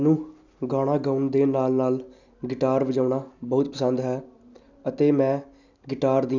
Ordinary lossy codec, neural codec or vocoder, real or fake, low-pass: none; none; real; 7.2 kHz